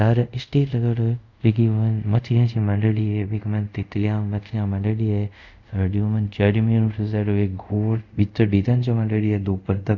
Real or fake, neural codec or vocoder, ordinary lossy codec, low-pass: fake; codec, 24 kHz, 0.5 kbps, DualCodec; none; 7.2 kHz